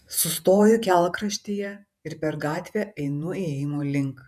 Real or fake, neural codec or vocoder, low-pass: fake; vocoder, 48 kHz, 128 mel bands, Vocos; 14.4 kHz